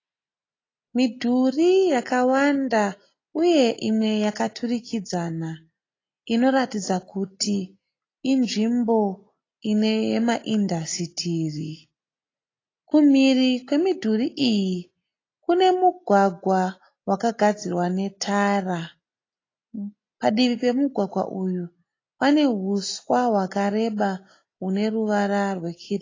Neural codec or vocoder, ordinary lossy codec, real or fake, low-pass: none; AAC, 32 kbps; real; 7.2 kHz